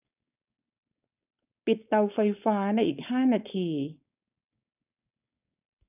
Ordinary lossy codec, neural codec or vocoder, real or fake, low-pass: none; codec, 16 kHz, 4.8 kbps, FACodec; fake; 3.6 kHz